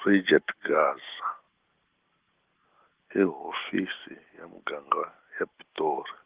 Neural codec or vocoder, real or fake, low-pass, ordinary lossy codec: none; real; 3.6 kHz; Opus, 16 kbps